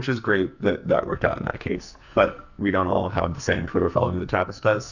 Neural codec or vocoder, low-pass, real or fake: codec, 44.1 kHz, 2.6 kbps, SNAC; 7.2 kHz; fake